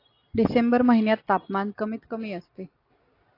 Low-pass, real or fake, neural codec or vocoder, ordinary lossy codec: 5.4 kHz; real; none; AAC, 32 kbps